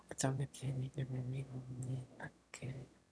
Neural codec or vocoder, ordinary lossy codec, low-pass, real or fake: autoencoder, 22.05 kHz, a latent of 192 numbers a frame, VITS, trained on one speaker; none; none; fake